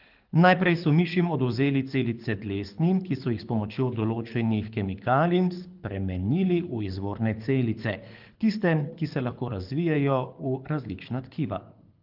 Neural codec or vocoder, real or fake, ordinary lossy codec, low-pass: codec, 24 kHz, 6 kbps, HILCodec; fake; Opus, 32 kbps; 5.4 kHz